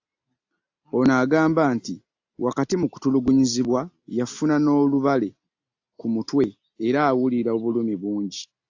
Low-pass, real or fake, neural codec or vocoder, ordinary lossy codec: 7.2 kHz; real; none; AAC, 48 kbps